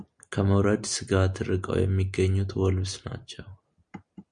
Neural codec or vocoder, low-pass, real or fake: none; 9.9 kHz; real